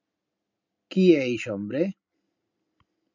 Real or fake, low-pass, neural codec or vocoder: real; 7.2 kHz; none